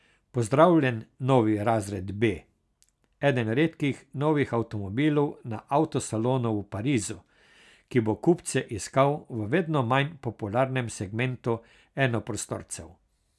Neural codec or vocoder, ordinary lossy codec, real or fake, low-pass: none; none; real; none